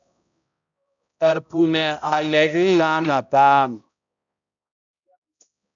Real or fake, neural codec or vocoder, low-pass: fake; codec, 16 kHz, 0.5 kbps, X-Codec, HuBERT features, trained on balanced general audio; 7.2 kHz